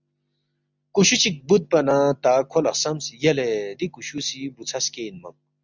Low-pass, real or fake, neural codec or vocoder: 7.2 kHz; real; none